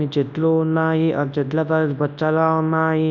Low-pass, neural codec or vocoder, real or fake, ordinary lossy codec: 7.2 kHz; codec, 24 kHz, 0.9 kbps, WavTokenizer, large speech release; fake; none